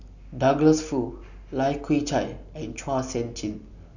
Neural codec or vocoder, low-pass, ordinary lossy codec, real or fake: none; 7.2 kHz; none; real